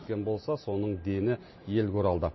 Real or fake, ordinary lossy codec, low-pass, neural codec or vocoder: real; MP3, 24 kbps; 7.2 kHz; none